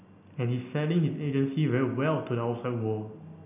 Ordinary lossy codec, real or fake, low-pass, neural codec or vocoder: none; real; 3.6 kHz; none